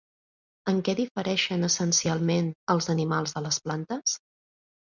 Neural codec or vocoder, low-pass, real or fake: none; 7.2 kHz; real